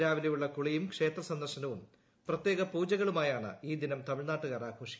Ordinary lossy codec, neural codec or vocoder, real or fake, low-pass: none; none; real; none